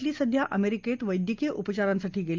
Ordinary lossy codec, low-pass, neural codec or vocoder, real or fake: Opus, 24 kbps; 7.2 kHz; none; real